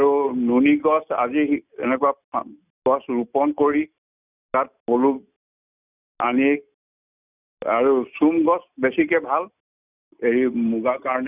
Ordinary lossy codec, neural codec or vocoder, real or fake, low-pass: none; none; real; 3.6 kHz